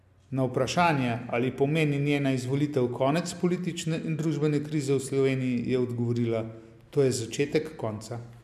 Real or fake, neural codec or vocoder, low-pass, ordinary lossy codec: real; none; 14.4 kHz; AAC, 96 kbps